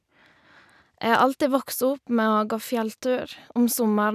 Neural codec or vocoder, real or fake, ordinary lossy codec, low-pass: none; real; none; 14.4 kHz